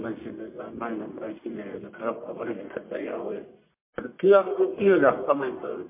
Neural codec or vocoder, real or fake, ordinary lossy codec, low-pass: codec, 44.1 kHz, 1.7 kbps, Pupu-Codec; fake; MP3, 24 kbps; 3.6 kHz